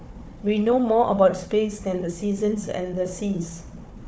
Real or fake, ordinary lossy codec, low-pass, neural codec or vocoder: fake; none; none; codec, 16 kHz, 4 kbps, FunCodec, trained on Chinese and English, 50 frames a second